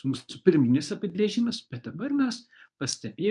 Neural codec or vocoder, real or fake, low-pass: codec, 24 kHz, 0.9 kbps, WavTokenizer, medium speech release version 1; fake; 10.8 kHz